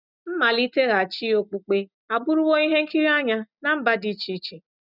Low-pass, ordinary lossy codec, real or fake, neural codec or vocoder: 5.4 kHz; none; real; none